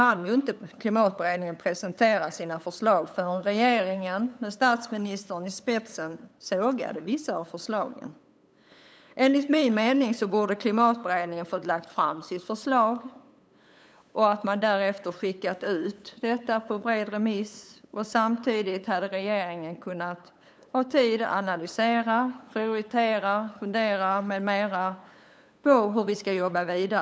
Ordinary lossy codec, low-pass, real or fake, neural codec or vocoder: none; none; fake; codec, 16 kHz, 8 kbps, FunCodec, trained on LibriTTS, 25 frames a second